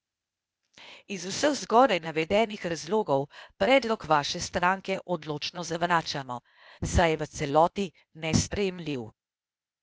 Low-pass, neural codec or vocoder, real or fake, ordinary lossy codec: none; codec, 16 kHz, 0.8 kbps, ZipCodec; fake; none